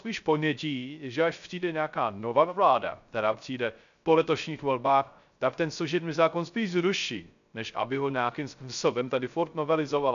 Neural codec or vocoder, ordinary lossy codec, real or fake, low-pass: codec, 16 kHz, 0.3 kbps, FocalCodec; AAC, 96 kbps; fake; 7.2 kHz